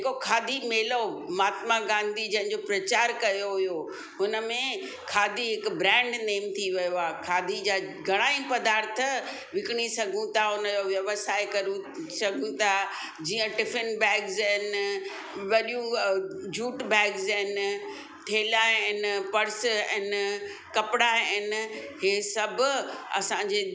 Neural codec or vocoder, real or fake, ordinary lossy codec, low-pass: none; real; none; none